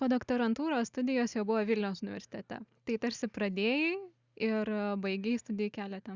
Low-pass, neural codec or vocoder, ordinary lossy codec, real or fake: 7.2 kHz; none; Opus, 64 kbps; real